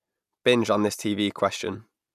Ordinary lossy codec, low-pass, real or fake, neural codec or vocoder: none; 14.4 kHz; real; none